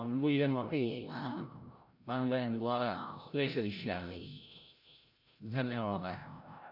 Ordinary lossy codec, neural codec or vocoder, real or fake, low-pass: none; codec, 16 kHz, 0.5 kbps, FreqCodec, larger model; fake; 5.4 kHz